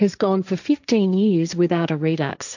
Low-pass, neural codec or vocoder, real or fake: 7.2 kHz; codec, 16 kHz, 1.1 kbps, Voila-Tokenizer; fake